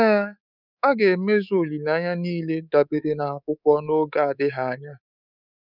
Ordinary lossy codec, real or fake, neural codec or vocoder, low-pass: none; fake; codec, 24 kHz, 3.1 kbps, DualCodec; 5.4 kHz